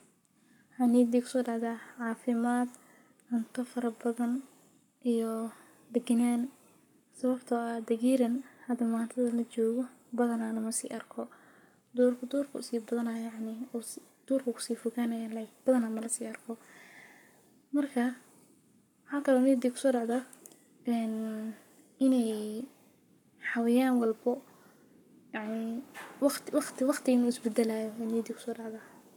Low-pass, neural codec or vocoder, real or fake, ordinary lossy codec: 19.8 kHz; codec, 44.1 kHz, 7.8 kbps, Pupu-Codec; fake; none